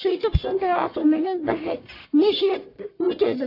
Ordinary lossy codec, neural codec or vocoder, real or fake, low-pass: AAC, 32 kbps; codec, 44.1 kHz, 1.7 kbps, Pupu-Codec; fake; 5.4 kHz